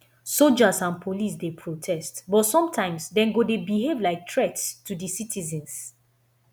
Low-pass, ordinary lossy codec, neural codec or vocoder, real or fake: none; none; none; real